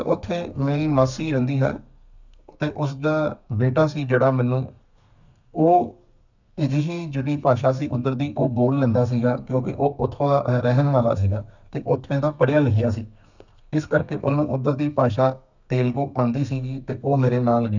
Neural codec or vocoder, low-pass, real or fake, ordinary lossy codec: codec, 32 kHz, 1.9 kbps, SNAC; 7.2 kHz; fake; none